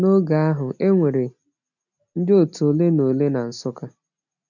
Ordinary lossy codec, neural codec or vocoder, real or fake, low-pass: none; none; real; 7.2 kHz